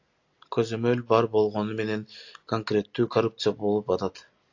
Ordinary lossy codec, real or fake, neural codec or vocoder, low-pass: AAC, 48 kbps; real; none; 7.2 kHz